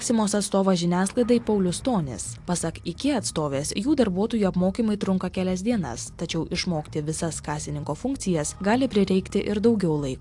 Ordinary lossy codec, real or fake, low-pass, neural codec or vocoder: AAC, 64 kbps; real; 10.8 kHz; none